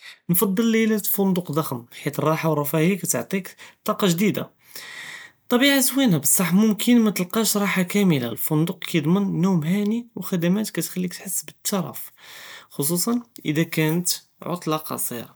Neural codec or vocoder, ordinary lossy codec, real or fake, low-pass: none; none; real; none